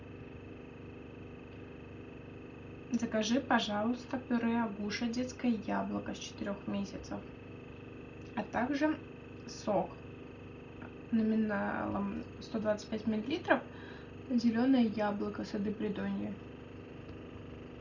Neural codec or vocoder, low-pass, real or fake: none; 7.2 kHz; real